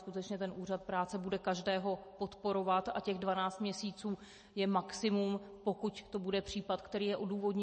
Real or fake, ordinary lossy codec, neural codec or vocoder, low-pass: real; MP3, 32 kbps; none; 10.8 kHz